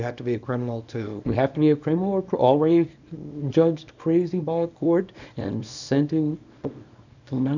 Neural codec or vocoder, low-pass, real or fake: codec, 24 kHz, 0.9 kbps, WavTokenizer, small release; 7.2 kHz; fake